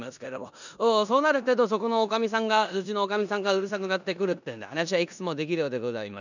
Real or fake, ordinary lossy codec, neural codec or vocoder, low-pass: fake; none; codec, 16 kHz in and 24 kHz out, 0.9 kbps, LongCat-Audio-Codec, four codebook decoder; 7.2 kHz